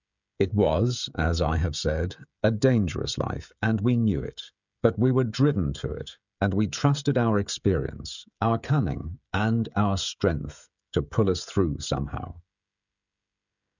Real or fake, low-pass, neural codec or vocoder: fake; 7.2 kHz; codec, 16 kHz, 16 kbps, FreqCodec, smaller model